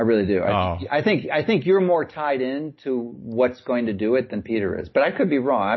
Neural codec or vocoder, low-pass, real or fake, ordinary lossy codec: none; 7.2 kHz; real; MP3, 24 kbps